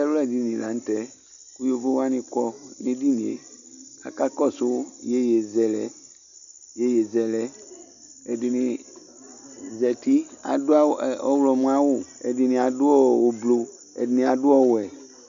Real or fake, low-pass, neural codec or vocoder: real; 7.2 kHz; none